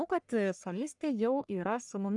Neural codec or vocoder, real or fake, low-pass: codec, 44.1 kHz, 1.7 kbps, Pupu-Codec; fake; 10.8 kHz